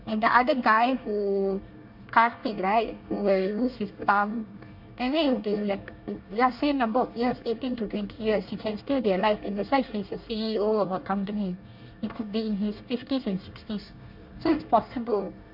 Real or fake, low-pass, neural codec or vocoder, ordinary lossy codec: fake; 5.4 kHz; codec, 24 kHz, 1 kbps, SNAC; MP3, 48 kbps